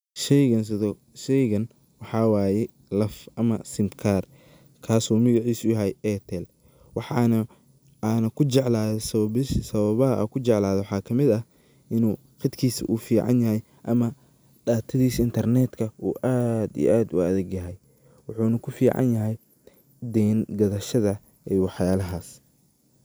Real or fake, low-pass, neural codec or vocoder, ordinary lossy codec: real; none; none; none